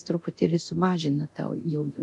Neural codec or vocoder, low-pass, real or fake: codec, 24 kHz, 0.9 kbps, DualCodec; 10.8 kHz; fake